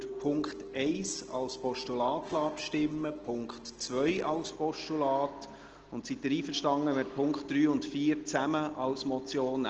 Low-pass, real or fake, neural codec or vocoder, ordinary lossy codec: 7.2 kHz; real; none; Opus, 16 kbps